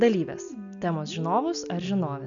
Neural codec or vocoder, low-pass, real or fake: none; 7.2 kHz; real